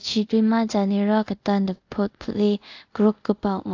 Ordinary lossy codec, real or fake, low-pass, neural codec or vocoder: AAC, 48 kbps; fake; 7.2 kHz; codec, 24 kHz, 0.5 kbps, DualCodec